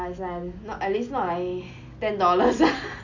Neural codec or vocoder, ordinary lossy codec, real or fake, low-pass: none; none; real; 7.2 kHz